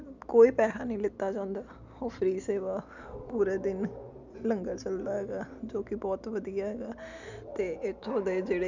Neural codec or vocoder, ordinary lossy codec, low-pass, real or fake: none; none; 7.2 kHz; real